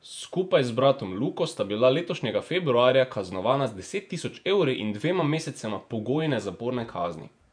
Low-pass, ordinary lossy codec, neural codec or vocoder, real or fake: 9.9 kHz; none; vocoder, 48 kHz, 128 mel bands, Vocos; fake